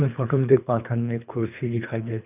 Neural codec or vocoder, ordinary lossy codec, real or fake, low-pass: codec, 24 kHz, 3 kbps, HILCodec; none; fake; 3.6 kHz